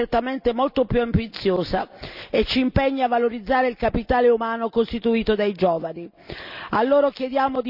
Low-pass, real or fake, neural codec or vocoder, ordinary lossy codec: 5.4 kHz; real; none; none